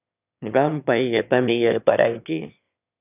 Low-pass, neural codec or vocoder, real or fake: 3.6 kHz; autoencoder, 22.05 kHz, a latent of 192 numbers a frame, VITS, trained on one speaker; fake